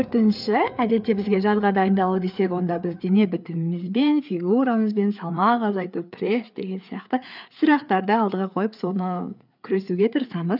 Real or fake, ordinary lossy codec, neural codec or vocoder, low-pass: fake; none; codec, 16 kHz, 4 kbps, FreqCodec, larger model; 5.4 kHz